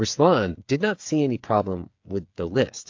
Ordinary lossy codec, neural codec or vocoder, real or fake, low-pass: AAC, 48 kbps; codec, 16 kHz, 8 kbps, FreqCodec, smaller model; fake; 7.2 kHz